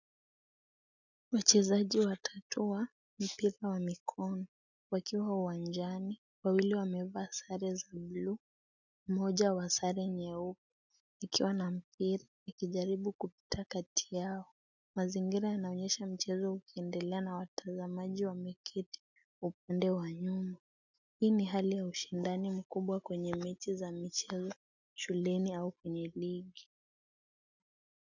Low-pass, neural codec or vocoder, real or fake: 7.2 kHz; none; real